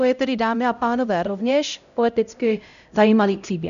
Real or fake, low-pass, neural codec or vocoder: fake; 7.2 kHz; codec, 16 kHz, 0.5 kbps, X-Codec, HuBERT features, trained on LibriSpeech